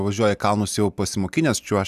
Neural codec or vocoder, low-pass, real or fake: none; 14.4 kHz; real